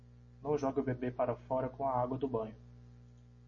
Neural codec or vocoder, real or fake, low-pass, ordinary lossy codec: none; real; 7.2 kHz; MP3, 32 kbps